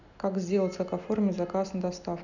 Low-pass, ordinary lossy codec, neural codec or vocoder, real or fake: 7.2 kHz; none; none; real